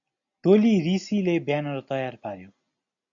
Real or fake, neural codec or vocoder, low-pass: real; none; 7.2 kHz